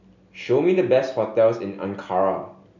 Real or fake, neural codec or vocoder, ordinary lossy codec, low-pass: real; none; none; 7.2 kHz